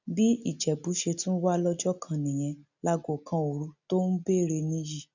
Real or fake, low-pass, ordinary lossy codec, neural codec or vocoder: real; 7.2 kHz; none; none